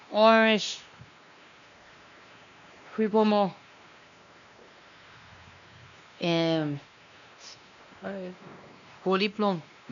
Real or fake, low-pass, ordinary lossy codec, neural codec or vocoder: fake; 7.2 kHz; none; codec, 16 kHz, 2 kbps, X-Codec, WavLM features, trained on Multilingual LibriSpeech